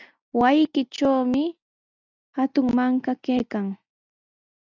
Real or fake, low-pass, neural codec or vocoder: real; 7.2 kHz; none